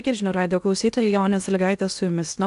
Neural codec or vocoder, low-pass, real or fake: codec, 16 kHz in and 24 kHz out, 0.8 kbps, FocalCodec, streaming, 65536 codes; 10.8 kHz; fake